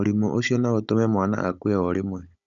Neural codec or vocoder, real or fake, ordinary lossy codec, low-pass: codec, 16 kHz, 16 kbps, FunCodec, trained on Chinese and English, 50 frames a second; fake; none; 7.2 kHz